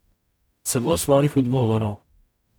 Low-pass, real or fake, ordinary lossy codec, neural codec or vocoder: none; fake; none; codec, 44.1 kHz, 0.9 kbps, DAC